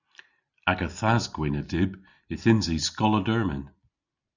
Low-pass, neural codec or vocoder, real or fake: 7.2 kHz; none; real